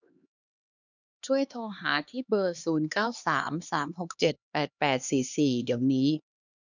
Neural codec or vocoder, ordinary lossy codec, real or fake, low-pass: codec, 16 kHz, 2 kbps, X-Codec, HuBERT features, trained on LibriSpeech; none; fake; 7.2 kHz